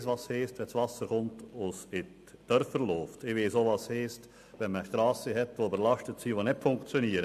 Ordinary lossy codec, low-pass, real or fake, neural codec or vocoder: AAC, 96 kbps; 14.4 kHz; real; none